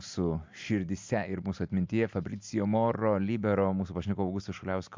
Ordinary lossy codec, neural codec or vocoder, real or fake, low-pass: MP3, 64 kbps; none; real; 7.2 kHz